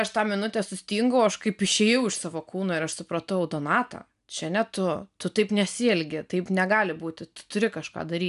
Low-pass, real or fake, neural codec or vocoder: 10.8 kHz; real; none